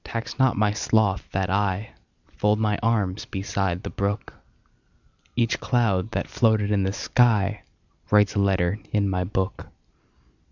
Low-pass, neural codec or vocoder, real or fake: 7.2 kHz; none; real